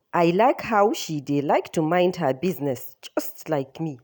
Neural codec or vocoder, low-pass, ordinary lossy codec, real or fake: none; none; none; real